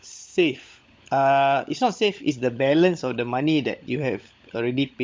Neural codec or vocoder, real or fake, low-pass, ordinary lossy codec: codec, 16 kHz, 16 kbps, FunCodec, trained on LibriTTS, 50 frames a second; fake; none; none